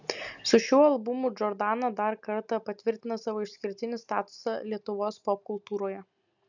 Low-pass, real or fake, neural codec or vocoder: 7.2 kHz; real; none